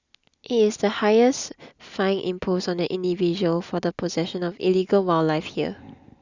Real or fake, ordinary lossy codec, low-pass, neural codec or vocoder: real; Opus, 64 kbps; 7.2 kHz; none